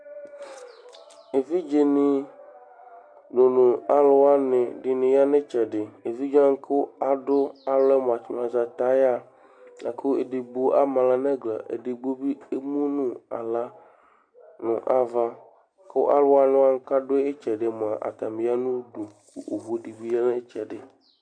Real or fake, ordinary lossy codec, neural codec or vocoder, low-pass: real; MP3, 64 kbps; none; 9.9 kHz